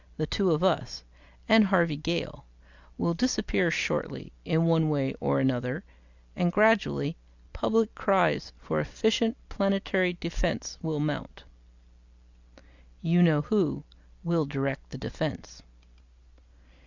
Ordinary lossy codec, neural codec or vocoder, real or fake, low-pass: Opus, 64 kbps; none; real; 7.2 kHz